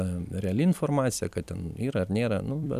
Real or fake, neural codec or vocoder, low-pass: fake; vocoder, 44.1 kHz, 128 mel bands every 256 samples, BigVGAN v2; 14.4 kHz